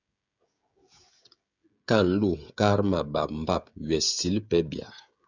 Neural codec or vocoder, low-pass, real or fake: codec, 16 kHz, 16 kbps, FreqCodec, smaller model; 7.2 kHz; fake